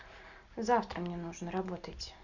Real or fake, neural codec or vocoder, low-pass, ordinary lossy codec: real; none; 7.2 kHz; none